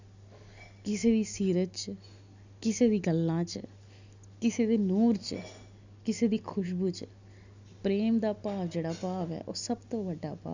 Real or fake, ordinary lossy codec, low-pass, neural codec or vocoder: real; Opus, 64 kbps; 7.2 kHz; none